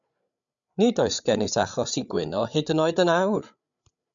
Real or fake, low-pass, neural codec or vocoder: fake; 7.2 kHz; codec, 16 kHz, 8 kbps, FreqCodec, larger model